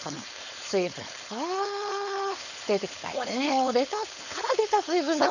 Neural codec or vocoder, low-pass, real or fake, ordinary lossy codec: codec, 16 kHz, 4.8 kbps, FACodec; 7.2 kHz; fake; none